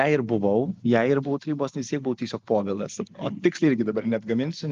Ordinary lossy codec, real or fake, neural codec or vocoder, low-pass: Opus, 32 kbps; real; none; 7.2 kHz